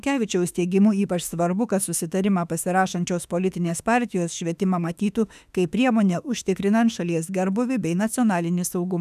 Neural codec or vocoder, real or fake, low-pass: autoencoder, 48 kHz, 32 numbers a frame, DAC-VAE, trained on Japanese speech; fake; 14.4 kHz